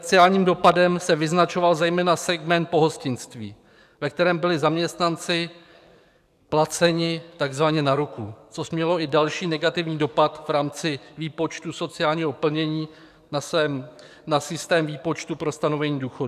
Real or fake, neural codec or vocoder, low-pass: fake; codec, 44.1 kHz, 7.8 kbps, DAC; 14.4 kHz